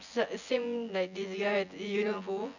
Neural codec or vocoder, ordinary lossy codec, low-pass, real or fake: vocoder, 24 kHz, 100 mel bands, Vocos; none; 7.2 kHz; fake